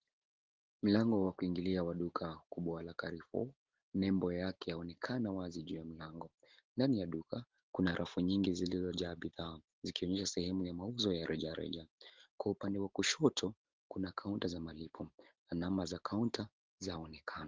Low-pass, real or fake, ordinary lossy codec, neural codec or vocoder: 7.2 kHz; real; Opus, 16 kbps; none